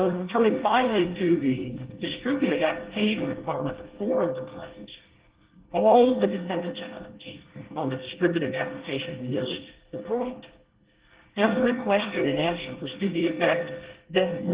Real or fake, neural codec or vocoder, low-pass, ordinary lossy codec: fake; codec, 24 kHz, 1 kbps, SNAC; 3.6 kHz; Opus, 16 kbps